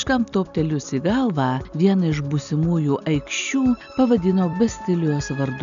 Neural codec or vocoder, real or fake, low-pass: none; real; 7.2 kHz